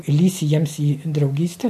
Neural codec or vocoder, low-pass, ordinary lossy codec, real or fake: none; 14.4 kHz; MP3, 64 kbps; real